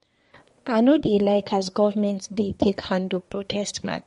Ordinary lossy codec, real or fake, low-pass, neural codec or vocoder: MP3, 48 kbps; fake; 10.8 kHz; codec, 24 kHz, 1 kbps, SNAC